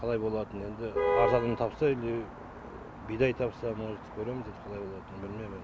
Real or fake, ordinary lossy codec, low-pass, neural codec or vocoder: real; none; none; none